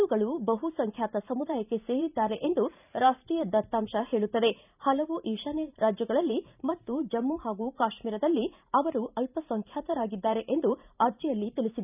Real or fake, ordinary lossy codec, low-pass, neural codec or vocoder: fake; none; 3.6 kHz; vocoder, 44.1 kHz, 128 mel bands every 512 samples, BigVGAN v2